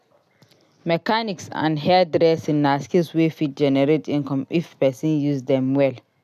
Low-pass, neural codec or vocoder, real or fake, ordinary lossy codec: 14.4 kHz; none; real; none